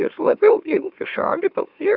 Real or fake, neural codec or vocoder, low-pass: fake; autoencoder, 44.1 kHz, a latent of 192 numbers a frame, MeloTTS; 5.4 kHz